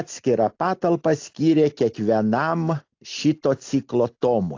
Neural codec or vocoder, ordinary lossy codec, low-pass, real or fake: none; AAC, 48 kbps; 7.2 kHz; real